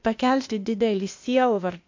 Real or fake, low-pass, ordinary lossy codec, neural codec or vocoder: fake; 7.2 kHz; MP3, 48 kbps; codec, 16 kHz, 0.8 kbps, ZipCodec